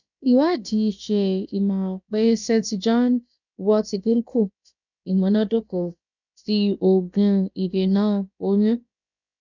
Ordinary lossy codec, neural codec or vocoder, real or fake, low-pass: none; codec, 16 kHz, about 1 kbps, DyCAST, with the encoder's durations; fake; 7.2 kHz